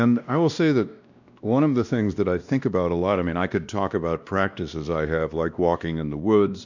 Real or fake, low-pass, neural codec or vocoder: fake; 7.2 kHz; codec, 16 kHz, 1 kbps, X-Codec, WavLM features, trained on Multilingual LibriSpeech